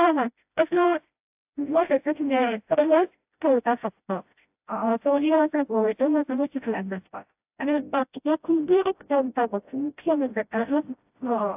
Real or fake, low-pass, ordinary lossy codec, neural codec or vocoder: fake; 3.6 kHz; AAC, 32 kbps; codec, 16 kHz, 0.5 kbps, FreqCodec, smaller model